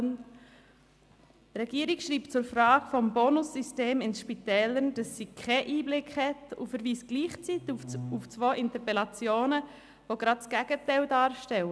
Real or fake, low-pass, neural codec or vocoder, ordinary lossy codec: real; none; none; none